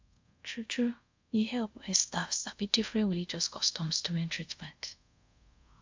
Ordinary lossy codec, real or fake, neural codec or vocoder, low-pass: MP3, 64 kbps; fake; codec, 24 kHz, 0.5 kbps, DualCodec; 7.2 kHz